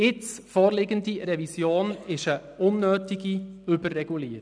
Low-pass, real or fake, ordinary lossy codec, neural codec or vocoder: 9.9 kHz; real; none; none